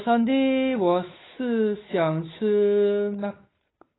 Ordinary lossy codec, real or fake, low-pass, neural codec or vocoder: AAC, 16 kbps; fake; 7.2 kHz; codec, 16 kHz, 8 kbps, FunCodec, trained on Chinese and English, 25 frames a second